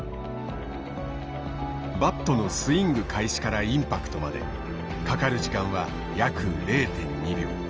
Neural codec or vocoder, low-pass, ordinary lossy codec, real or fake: none; 7.2 kHz; Opus, 24 kbps; real